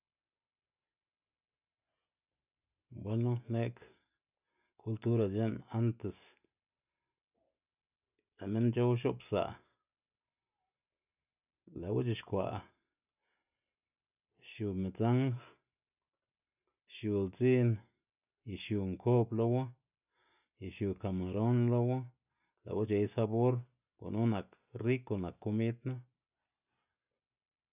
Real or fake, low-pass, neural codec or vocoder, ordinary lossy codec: real; 3.6 kHz; none; none